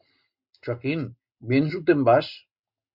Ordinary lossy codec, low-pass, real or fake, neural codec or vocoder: Opus, 64 kbps; 5.4 kHz; real; none